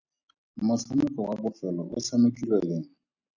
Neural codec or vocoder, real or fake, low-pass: none; real; 7.2 kHz